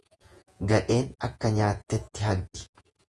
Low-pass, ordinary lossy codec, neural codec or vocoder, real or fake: 10.8 kHz; Opus, 32 kbps; vocoder, 48 kHz, 128 mel bands, Vocos; fake